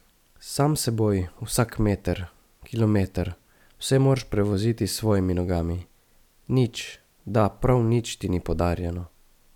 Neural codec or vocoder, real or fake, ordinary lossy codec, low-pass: none; real; none; 19.8 kHz